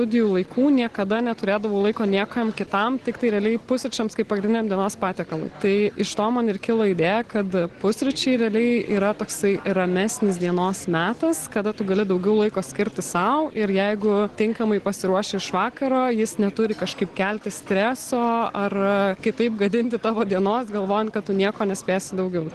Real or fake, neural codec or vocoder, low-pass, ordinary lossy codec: real; none; 10.8 kHz; Opus, 16 kbps